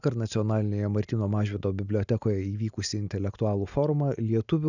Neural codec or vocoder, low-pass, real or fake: none; 7.2 kHz; real